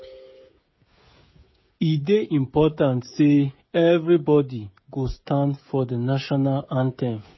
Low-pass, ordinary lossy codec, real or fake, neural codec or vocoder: 7.2 kHz; MP3, 24 kbps; fake; codec, 16 kHz, 16 kbps, FreqCodec, smaller model